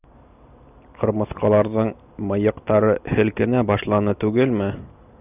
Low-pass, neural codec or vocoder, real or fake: 3.6 kHz; none; real